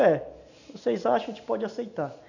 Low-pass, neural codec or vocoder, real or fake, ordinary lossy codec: 7.2 kHz; none; real; none